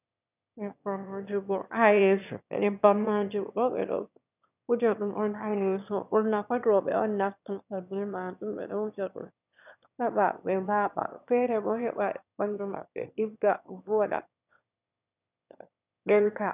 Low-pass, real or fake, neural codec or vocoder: 3.6 kHz; fake; autoencoder, 22.05 kHz, a latent of 192 numbers a frame, VITS, trained on one speaker